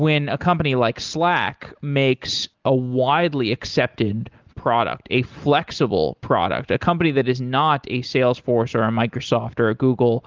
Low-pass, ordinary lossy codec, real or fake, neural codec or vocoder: 7.2 kHz; Opus, 32 kbps; real; none